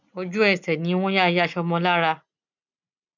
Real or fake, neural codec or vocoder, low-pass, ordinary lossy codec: real; none; 7.2 kHz; none